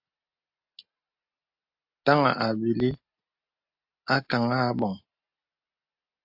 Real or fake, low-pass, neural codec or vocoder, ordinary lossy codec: real; 5.4 kHz; none; AAC, 48 kbps